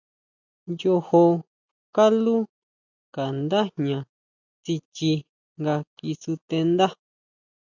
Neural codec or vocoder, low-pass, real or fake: none; 7.2 kHz; real